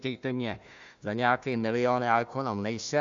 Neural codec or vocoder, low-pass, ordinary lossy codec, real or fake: codec, 16 kHz, 1 kbps, FunCodec, trained on Chinese and English, 50 frames a second; 7.2 kHz; AAC, 64 kbps; fake